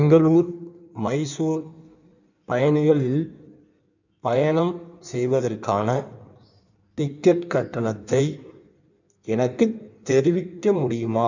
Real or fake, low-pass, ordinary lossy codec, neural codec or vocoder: fake; 7.2 kHz; none; codec, 16 kHz in and 24 kHz out, 1.1 kbps, FireRedTTS-2 codec